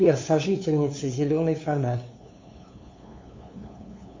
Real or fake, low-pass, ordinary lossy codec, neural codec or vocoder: fake; 7.2 kHz; MP3, 48 kbps; codec, 16 kHz, 2 kbps, FunCodec, trained on LibriTTS, 25 frames a second